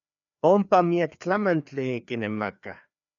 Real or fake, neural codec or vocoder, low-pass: fake; codec, 16 kHz, 2 kbps, FreqCodec, larger model; 7.2 kHz